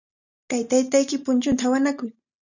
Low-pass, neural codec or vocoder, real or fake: 7.2 kHz; none; real